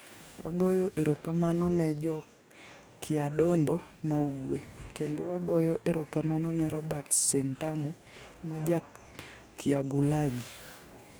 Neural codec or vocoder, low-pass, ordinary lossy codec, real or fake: codec, 44.1 kHz, 2.6 kbps, DAC; none; none; fake